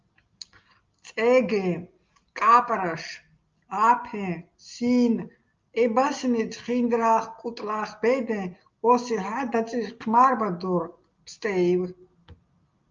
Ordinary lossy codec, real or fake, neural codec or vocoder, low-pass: Opus, 24 kbps; real; none; 7.2 kHz